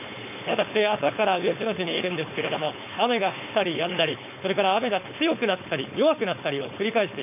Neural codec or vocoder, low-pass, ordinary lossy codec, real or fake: codec, 16 kHz, 4.8 kbps, FACodec; 3.6 kHz; none; fake